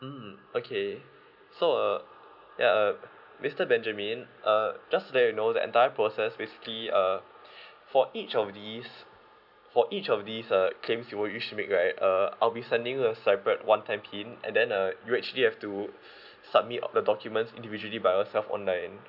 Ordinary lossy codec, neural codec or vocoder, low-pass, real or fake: none; none; 5.4 kHz; real